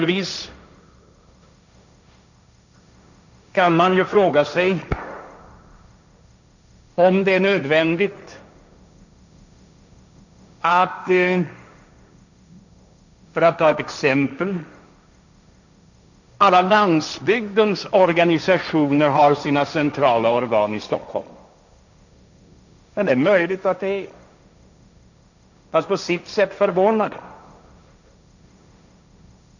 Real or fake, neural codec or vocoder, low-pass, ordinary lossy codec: fake; codec, 16 kHz, 1.1 kbps, Voila-Tokenizer; 7.2 kHz; none